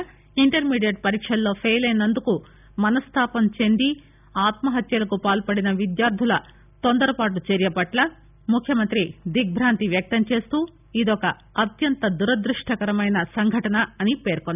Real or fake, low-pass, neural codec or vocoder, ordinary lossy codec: real; 3.6 kHz; none; none